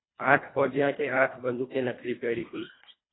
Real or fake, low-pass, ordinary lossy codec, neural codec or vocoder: fake; 7.2 kHz; AAC, 16 kbps; codec, 24 kHz, 1.5 kbps, HILCodec